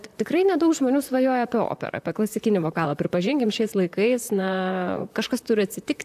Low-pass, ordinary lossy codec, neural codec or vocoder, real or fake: 14.4 kHz; AAC, 96 kbps; vocoder, 44.1 kHz, 128 mel bands, Pupu-Vocoder; fake